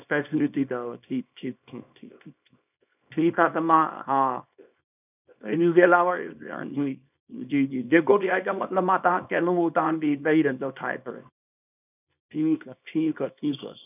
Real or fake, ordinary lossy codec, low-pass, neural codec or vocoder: fake; none; 3.6 kHz; codec, 24 kHz, 0.9 kbps, WavTokenizer, small release